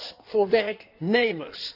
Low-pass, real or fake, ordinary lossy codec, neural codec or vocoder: 5.4 kHz; fake; none; codec, 16 kHz in and 24 kHz out, 1.1 kbps, FireRedTTS-2 codec